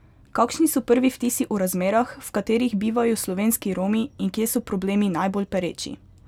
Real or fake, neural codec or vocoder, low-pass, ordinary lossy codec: real; none; 19.8 kHz; none